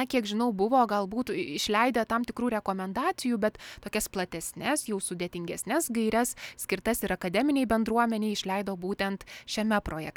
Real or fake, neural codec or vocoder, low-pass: real; none; 19.8 kHz